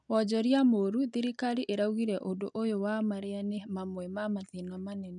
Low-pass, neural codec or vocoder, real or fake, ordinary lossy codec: 9.9 kHz; none; real; none